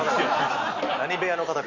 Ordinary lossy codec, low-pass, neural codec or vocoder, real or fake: none; 7.2 kHz; none; real